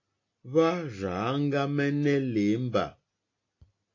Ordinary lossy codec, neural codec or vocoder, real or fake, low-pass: AAC, 48 kbps; none; real; 7.2 kHz